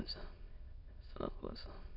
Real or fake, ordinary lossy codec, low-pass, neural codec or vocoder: fake; none; 5.4 kHz; autoencoder, 22.05 kHz, a latent of 192 numbers a frame, VITS, trained on many speakers